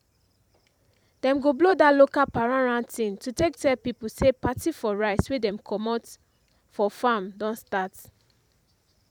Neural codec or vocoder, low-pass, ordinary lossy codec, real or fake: vocoder, 44.1 kHz, 128 mel bands every 512 samples, BigVGAN v2; 19.8 kHz; none; fake